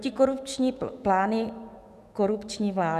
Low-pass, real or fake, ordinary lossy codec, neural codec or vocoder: 14.4 kHz; fake; Opus, 64 kbps; autoencoder, 48 kHz, 128 numbers a frame, DAC-VAE, trained on Japanese speech